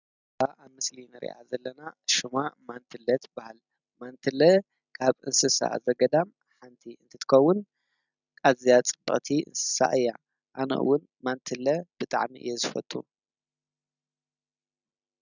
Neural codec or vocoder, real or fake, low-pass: none; real; 7.2 kHz